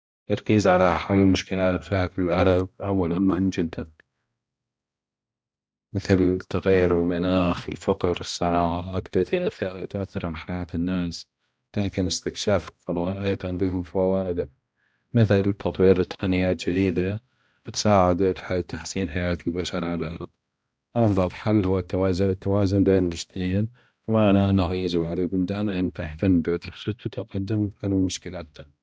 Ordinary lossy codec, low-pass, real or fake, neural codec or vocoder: none; none; fake; codec, 16 kHz, 1 kbps, X-Codec, HuBERT features, trained on balanced general audio